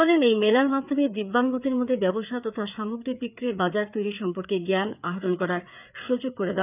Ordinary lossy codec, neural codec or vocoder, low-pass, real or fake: none; codec, 16 kHz, 4 kbps, FreqCodec, larger model; 3.6 kHz; fake